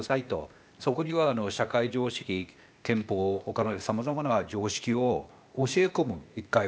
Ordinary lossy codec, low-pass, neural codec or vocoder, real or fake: none; none; codec, 16 kHz, 0.8 kbps, ZipCodec; fake